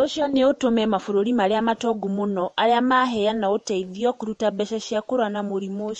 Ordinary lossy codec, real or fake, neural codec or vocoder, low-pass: MP3, 48 kbps; fake; vocoder, 48 kHz, 128 mel bands, Vocos; 19.8 kHz